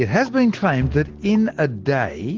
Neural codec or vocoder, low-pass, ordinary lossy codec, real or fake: none; 7.2 kHz; Opus, 16 kbps; real